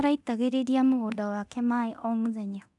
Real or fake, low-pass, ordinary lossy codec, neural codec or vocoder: fake; 10.8 kHz; none; codec, 24 kHz, 0.9 kbps, DualCodec